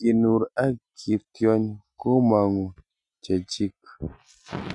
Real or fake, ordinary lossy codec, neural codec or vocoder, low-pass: real; none; none; 10.8 kHz